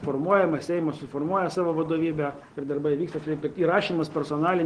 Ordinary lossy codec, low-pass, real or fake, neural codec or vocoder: Opus, 16 kbps; 9.9 kHz; real; none